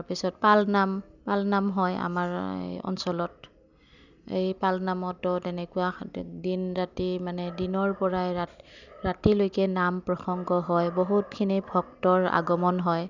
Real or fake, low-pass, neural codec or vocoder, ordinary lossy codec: real; 7.2 kHz; none; none